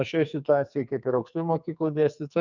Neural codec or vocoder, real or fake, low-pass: codec, 44.1 kHz, 2.6 kbps, SNAC; fake; 7.2 kHz